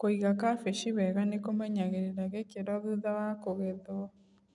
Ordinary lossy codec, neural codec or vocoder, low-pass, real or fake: none; none; 10.8 kHz; real